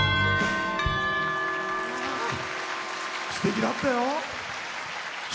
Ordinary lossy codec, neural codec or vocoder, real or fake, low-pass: none; none; real; none